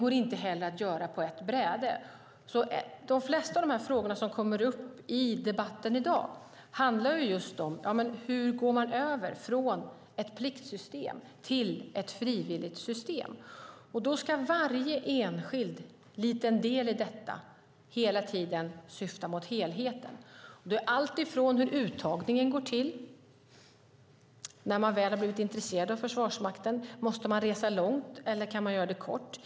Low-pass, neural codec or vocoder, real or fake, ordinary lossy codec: none; none; real; none